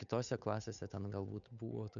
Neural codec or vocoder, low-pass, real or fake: codec, 16 kHz, 8 kbps, FunCodec, trained on Chinese and English, 25 frames a second; 7.2 kHz; fake